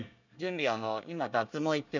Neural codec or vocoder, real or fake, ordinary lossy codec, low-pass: codec, 24 kHz, 1 kbps, SNAC; fake; none; 7.2 kHz